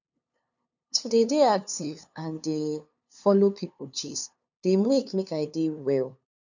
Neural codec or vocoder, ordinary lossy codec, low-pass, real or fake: codec, 16 kHz, 2 kbps, FunCodec, trained on LibriTTS, 25 frames a second; none; 7.2 kHz; fake